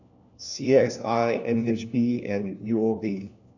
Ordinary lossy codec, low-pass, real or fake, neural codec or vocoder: none; 7.2 kHz; fake; codec, 16 kHz, 1 kbps, FunCodec, trained on LibriTTS, 50 frames a second